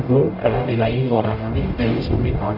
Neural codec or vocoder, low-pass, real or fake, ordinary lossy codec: codec, 44.1 kHz, 0.9 kbps, DAC; 5.4 kHz; fake; Opus, 32 kbps